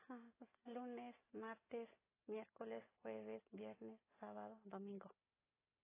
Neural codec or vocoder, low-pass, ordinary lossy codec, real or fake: none; 3.6 kHz; AAC, 16 kbps; real